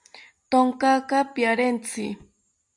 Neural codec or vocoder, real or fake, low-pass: none; real; 10.8 kHz